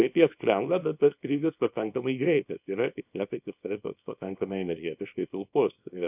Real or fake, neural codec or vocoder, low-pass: fake; codec, 24 kHz, 0.9 kbps, WavTokenizer, small release; 3.6 kHz